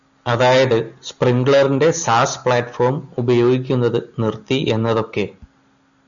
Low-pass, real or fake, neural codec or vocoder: 7.2 kHz; real; none